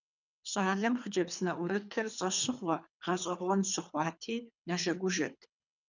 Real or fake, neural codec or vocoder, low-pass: fake; codec, 24 kHz, 3 kbps, HILCodec; 7.2 kHz